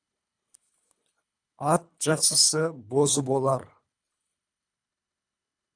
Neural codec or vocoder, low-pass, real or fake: codec, 24 kHz, 3 kbps, HILCodec; 9.9 kHz; fake